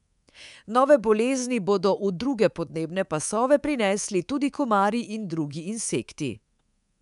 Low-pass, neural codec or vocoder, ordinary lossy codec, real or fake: 10.8 kHz; codec, 24 kHz, 3.1 kbps, DualCodec; none; fake